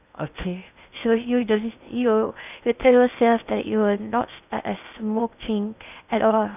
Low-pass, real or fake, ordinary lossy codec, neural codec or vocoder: 3.6 kHz; fake; none; codec, 16 kHz in and 24 kHz out, 0.6 kbps, FocalCodec, streaming, 2048 codes